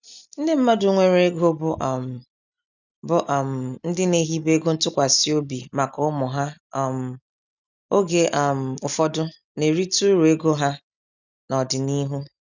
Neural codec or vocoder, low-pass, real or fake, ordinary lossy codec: none; 7.2 kHz; real; none